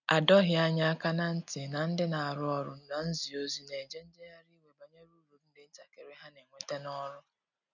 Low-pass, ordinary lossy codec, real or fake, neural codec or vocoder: 7.2 kHz; none; real; none